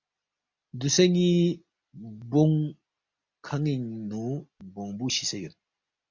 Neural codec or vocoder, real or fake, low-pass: none; real; 7.2 kHz